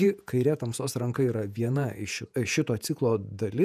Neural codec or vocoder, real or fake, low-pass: vocoder, 48 kHz, 128 mel bands, Vocos; fake; 14.4 kHz